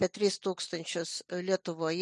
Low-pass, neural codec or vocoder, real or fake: 10.8 kHz; none; real